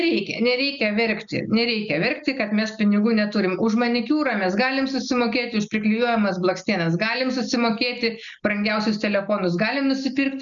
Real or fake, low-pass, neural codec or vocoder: real; 7.2 kHz; none